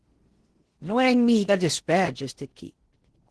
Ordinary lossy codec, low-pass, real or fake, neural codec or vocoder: Opus, 16 kbps; 10.8 kHz; fake; codec, 16 kHz in and 24 kHz out, 0.6 kbps, FocalCodec, streaming, 4096 codes